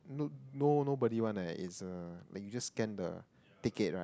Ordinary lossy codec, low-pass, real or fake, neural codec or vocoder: none; none; real; none